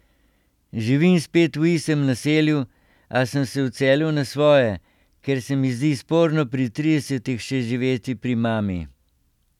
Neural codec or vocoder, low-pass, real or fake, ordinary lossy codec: none; 19.8 kHz; real; none